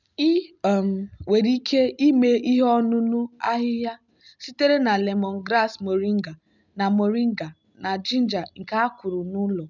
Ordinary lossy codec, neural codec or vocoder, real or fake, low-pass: none; none; real; 7.2 kHz